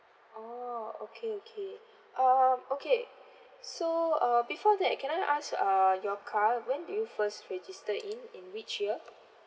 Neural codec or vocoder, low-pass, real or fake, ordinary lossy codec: none; none; real; none